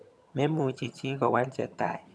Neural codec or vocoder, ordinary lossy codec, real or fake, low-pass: vocoder, 22.05 kHz, 80 mel bands, HiFi-GAN; none; fake; none